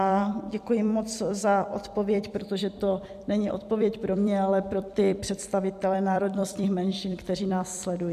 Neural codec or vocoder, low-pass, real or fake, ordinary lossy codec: vocoder, 44.1 kHz, 128 mel bands every 256 samples, BigVGAN v2; 14.4 kHz; fake; MP3, 96 kbps